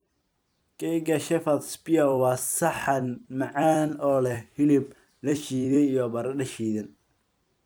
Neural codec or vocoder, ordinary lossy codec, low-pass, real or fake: vocoder, 44.1 kHz, 128 mel bands every 256 samples, BigVGAN v2; none; none; fake